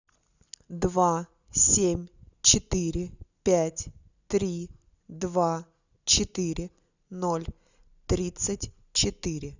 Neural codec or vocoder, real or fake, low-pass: none; real; 7.2 kHz